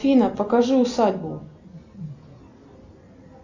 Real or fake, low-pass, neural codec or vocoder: real; 7.2 kHz; none